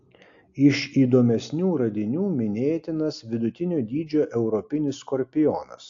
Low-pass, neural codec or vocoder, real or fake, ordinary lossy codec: 7.2 kHz; none; real; AAC, 48 kbps